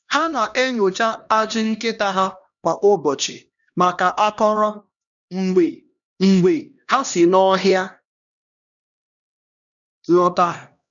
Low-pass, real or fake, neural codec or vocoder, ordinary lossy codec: 7.2 kHz; fake; codec, 16 kHz, 1 kbps, X-Codec, HuBERT features, trained on LibriSpeech; none